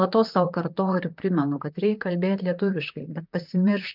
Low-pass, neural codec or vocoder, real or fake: 5.4 kHz; vocoder, 44.1 kHz, 128 mel bands, Pupu-Vocoder; fake